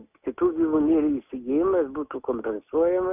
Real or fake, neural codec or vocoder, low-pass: real; none; 3.6 kHz